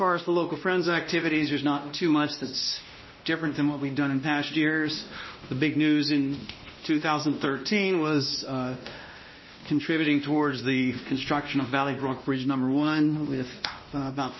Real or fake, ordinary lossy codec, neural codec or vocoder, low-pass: fake; MP3, 24 kbps; codec, 16 kHz, 1 kbps, X-Codec, WavLM features, trained on Multilingual LibriSpeech; 7.2 kHz